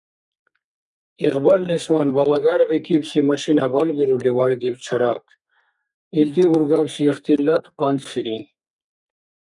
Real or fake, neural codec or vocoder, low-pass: fake; codec, 32 kHz, 1.9 kbps, SNAC; 10.8 kHz